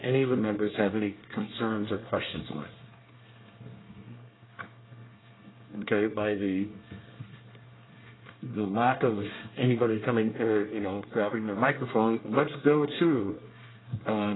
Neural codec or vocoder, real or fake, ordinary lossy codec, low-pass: codec, 24 kHz, 1 kbps, SNAC; fake; AAC, 16 kbps; 7.2 kHz